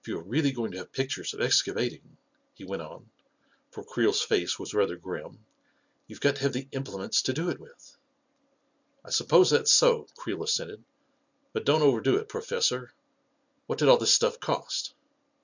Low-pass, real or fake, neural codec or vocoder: 7.2 kHz; real; none